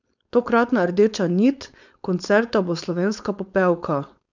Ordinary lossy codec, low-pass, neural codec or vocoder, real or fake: none; 7.2 kHz; codec, 16 kHz, 4.8 kbps, FACodec; fake